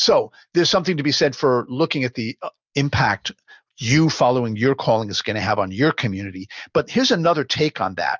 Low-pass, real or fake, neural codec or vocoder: 7.2 kHz; real; none